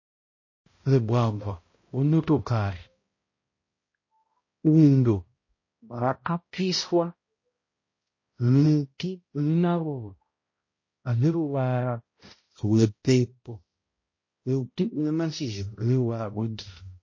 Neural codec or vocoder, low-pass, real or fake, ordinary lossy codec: codec, 16 kHz, 0.5 kbps, X-Codec, HuBERT features, trained on balanced general audio; 7.2 kHz; fake; MP3, 32 kbps